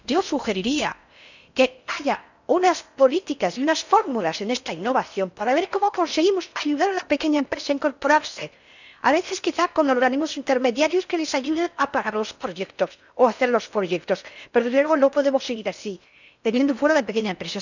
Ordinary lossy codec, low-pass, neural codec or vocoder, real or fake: none; 7.2 kHz; codec, 16 kHz in and 24 kHz out, 0.6 kbps, FocalCodec, streaming, 4096 codes; fake